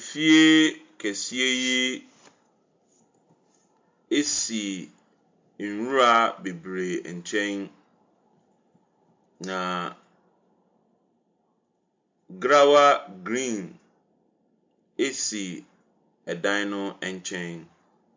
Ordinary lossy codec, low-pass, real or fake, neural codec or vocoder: MP3, 64 kbps; 7.2 kHz; real; none